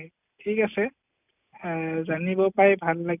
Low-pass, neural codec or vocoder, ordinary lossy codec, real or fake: 3.6 kHz; none; none; real